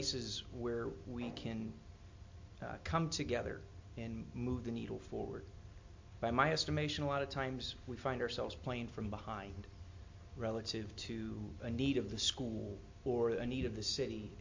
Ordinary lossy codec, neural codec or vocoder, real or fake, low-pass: MP3, 48 kbps; none; real; 7.2 kHz